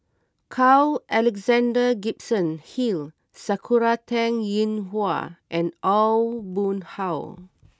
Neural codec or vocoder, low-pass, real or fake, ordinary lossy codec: none; none; real; none